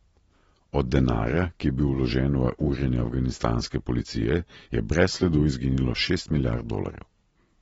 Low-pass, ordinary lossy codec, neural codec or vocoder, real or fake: 19.8 kHz; AAC, 24 kbps; none; real